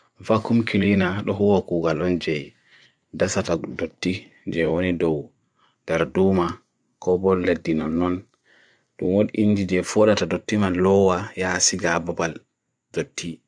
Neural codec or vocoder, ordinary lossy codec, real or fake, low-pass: vocoder, 24 kHz, 100 mel bands, Vocos; none; fake; 9.9 kHz